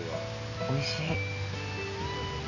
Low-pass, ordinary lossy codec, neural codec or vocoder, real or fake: 7.2 kHz; none; none; real